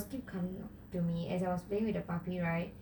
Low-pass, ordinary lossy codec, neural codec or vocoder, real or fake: none; none; none; real